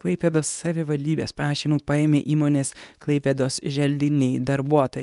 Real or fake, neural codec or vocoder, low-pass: fake; codec, 24 kHz, 0.9 kbps, WavTokenizer, medium speech release version 2; 10.8 kHz